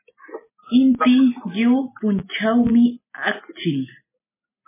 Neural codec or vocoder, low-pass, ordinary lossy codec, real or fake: none; 3.6 kHz; MP3, 16 kbps; real